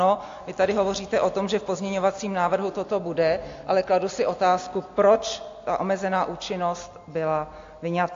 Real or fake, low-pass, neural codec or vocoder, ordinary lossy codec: real; 7.2 kHz; none; AAC, 48 kbps